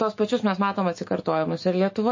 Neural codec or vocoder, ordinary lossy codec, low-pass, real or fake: autoencoder, 48 kHz, 128 numbers a frame, DAC-VAE, trained on Japanese speech; MP3, 32 kbps; 7.2 kHz; fake